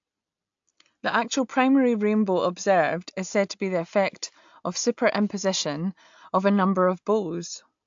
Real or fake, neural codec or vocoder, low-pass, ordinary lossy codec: real; none; 7.2 kHz; AAC, 64 kbps